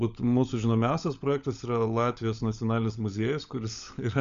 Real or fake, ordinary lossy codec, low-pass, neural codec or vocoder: fake; Opus, 64 kbps; 7.2 kHz; codec, 16 kHz, 16 kbps, FunCodec, trained on LibriTTS, 50 frames a second